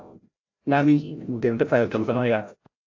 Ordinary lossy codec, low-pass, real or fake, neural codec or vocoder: AAC, 48 kbps; 7.2 kHz; fake; codec, 16 kHz, 0.5 kbps, FreqCodec, larger model